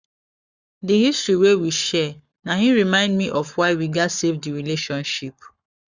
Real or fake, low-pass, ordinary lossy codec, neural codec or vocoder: fake; 7.2 kHz; Opus, 64 kbps; codec, 44.1 kHz, 7.8 kbps, Pupu-Codec